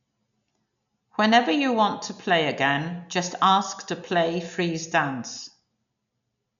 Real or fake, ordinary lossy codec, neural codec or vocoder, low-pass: real; none; none; 7.2 kHz